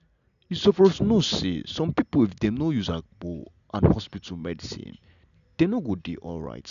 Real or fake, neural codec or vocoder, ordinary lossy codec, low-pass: real; none; none; 7.2 kHz